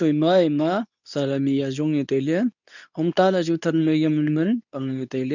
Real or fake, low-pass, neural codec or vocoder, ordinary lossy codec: fake; 7.2 kHz; codec, 24 kHz, 0.9 kbps, WavTokenizer, medium speech release version 2; none